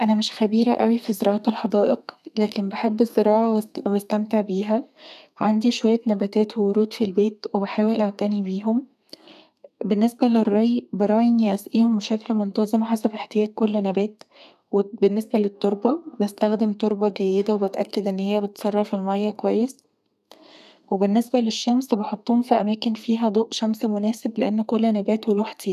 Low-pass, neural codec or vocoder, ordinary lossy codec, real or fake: 14.4 kHz; codec, 32 kHz, 1.9 kbps, SNAC; none; fake